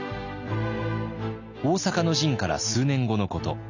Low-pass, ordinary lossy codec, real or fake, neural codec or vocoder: 7.2 kHz; none; real; none